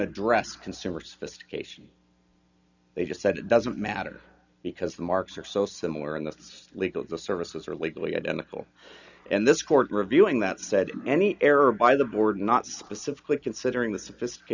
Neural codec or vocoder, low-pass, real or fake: none; 7.2 kHz; real